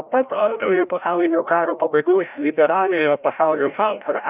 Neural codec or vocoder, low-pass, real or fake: codec, 16 kHz, 0.5 kbps, FreqCodec, larger model; 3.6 kHz; fake